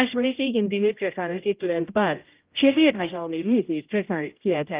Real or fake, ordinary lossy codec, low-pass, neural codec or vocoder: fake; Opus, 24 kbps; 3.6 kHz; codec, 16 kHz, 0.5 kbps, X-Codec, HuBERT features, trained on general audio